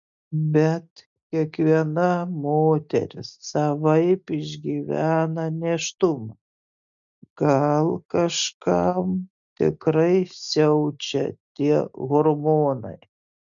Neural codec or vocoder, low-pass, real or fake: none; 7.2 kHz; real